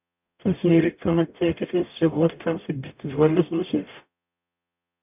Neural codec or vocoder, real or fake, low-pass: codec, 44.1 kHz, 0.9 kbps, DAC; fake; 3.6 kHz